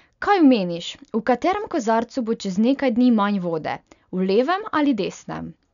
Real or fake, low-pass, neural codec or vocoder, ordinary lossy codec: real; 7.2 kHz; none; none